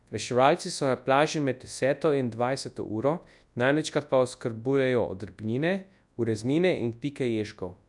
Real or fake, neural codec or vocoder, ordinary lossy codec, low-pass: fake; codec, 24 kHz, 0.9 kbps, WavTokenizer, large speech release; none; 10.8 kHz